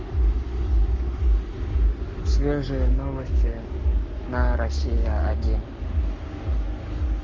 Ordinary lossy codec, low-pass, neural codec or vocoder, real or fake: Opus, 32 kbps; 7.2 kHz; codec, 44.1 kHz, 7.8 kbps, Pupu-Codec; fake